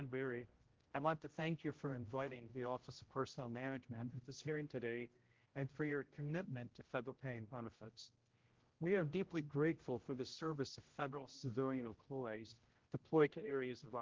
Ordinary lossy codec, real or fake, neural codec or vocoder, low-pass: Opus, 16 kbps; fake; codec, 16 kHz, 0.5 kbps, X-Codec, HuBERT features, trained on general audio; 7.2 kHz